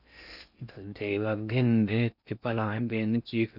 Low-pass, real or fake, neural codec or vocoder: 5.4 kHz; fake; codec, 16 kHz in and 24 kHz out, 0.6 kbps, FocalCodec, streaming, 4096 codes